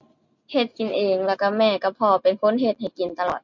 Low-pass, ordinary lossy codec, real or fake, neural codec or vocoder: 7.2 kHz; MP3, 48 kbps; real; none